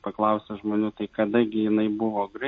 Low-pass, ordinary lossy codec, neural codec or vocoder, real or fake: 9.9 kHz; MP3, 32 kbps; none; real